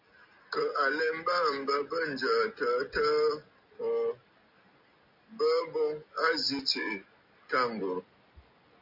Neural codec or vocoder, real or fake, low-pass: none; real; 5.4 kHz